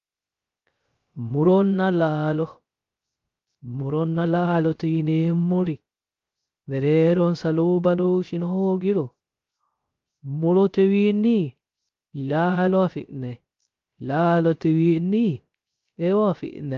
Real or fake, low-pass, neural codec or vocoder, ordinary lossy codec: fake; 7.2 kHz; codec, 16 kHz, 0.3 kbps, FocalCodec; Opus, 32 kbps